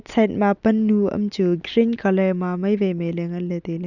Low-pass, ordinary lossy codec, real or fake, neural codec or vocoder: 7.2 kHz; none; real; none